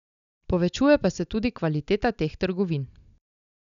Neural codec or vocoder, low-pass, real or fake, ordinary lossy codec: none; 7.2 kHz; real; none